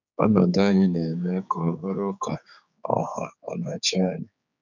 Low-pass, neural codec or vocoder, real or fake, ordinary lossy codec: 7.2 kHz; codec, 16 kHz, 4 kbps, X-Codec, HuBERT features, trained on general audio; fake; none